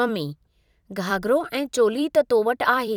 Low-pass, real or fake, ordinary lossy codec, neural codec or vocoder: 19.8 kHz; fake; none; vocoder, 44.1 kHz, 128 mel bands every 256 samples, BigVGAN v2